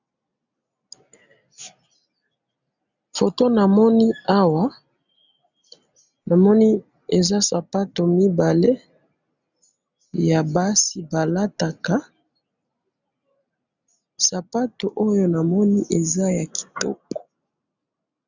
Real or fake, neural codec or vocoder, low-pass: real; none; 7.2 kHz